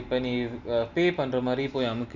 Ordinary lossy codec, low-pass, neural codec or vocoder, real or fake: none; 7.2 kHz; none; real